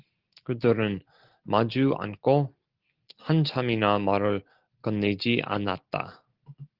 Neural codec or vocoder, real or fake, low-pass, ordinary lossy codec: none; real; 5.4 kHz; Opus, 16 kbps